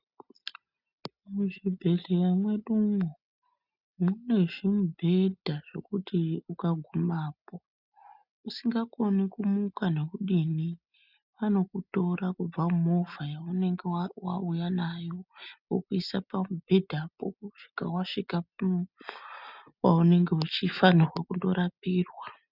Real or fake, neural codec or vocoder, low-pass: real; none; 5.4 kHz